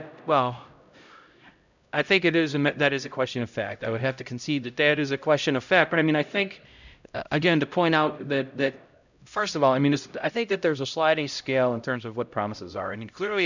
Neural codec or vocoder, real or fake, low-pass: codec, 16 kHz, 0.5 kbps, X-Codec, HuBERT features, trained on LibriSpeech; fake; 7.2 kHz